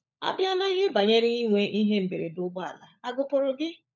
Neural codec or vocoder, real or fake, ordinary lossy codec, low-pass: codec, 16 kHz, 16 kbps, FunCodec, trained on LibriTTS, 50 frames a second; fake; none; 7.2 kHz